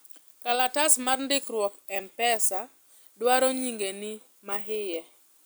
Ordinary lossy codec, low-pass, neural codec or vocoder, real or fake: none; none; none; real